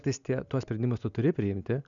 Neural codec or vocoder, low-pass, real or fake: none; 7.2 kHz; real